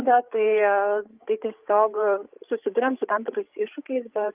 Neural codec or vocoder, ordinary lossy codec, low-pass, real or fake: codec, 16 kHz, 8 kbps, FreqCodec, larger model; Opus, 32 kbps; 3.6 kHz; fake